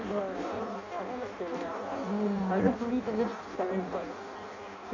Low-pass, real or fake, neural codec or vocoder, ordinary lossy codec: 7.2 kHz; fake; codec, 16 kHz in and 24 kHz out, 0.6 kbps, FireRedTTS-2 codec; MP3, 64 kbps